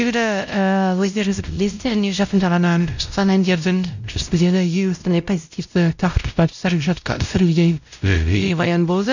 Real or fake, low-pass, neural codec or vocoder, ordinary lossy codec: fake; 7.2 kHz; codec, 16 kHz, 0.5 kbps, X-Codec, WavLM features, trained on Multilingual LibriSpeech; none